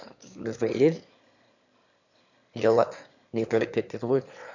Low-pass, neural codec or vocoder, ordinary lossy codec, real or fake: 7.2 kHz; autoencoder, 22.05 kHz, a latent of 192 numbers a frame, VITS, trained on one speaker; none; fake